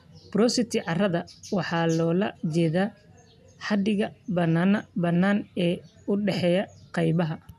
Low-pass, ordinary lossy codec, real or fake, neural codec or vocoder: 14.4 kHz; none; real; none